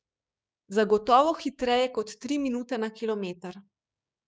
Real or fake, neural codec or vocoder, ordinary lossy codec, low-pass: fake; codec, 16 kHz, 6 kbps, DAC; none; none